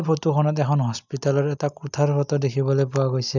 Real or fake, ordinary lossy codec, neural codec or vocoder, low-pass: real; none; none; 7.2 kHz